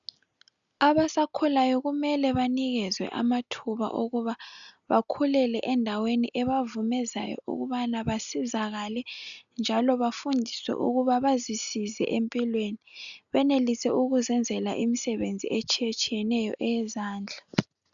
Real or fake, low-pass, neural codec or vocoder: real; 7.2 kHz; none